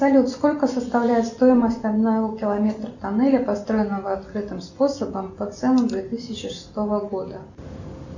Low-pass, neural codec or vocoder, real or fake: 7.2 kHz; none; real